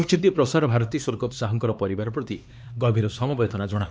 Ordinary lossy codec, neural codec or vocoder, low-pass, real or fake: none; codec, 16 kHz, 2 kbps, X-Codec, HuBERT features, trained on LibriSpeech; none; fake